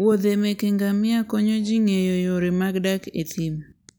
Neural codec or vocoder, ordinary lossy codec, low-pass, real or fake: none; none; none; real